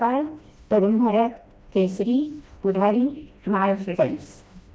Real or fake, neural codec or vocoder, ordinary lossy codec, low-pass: fake; codec, 16 kHz, 1 kbps, FreqCodec, smaller model; none; none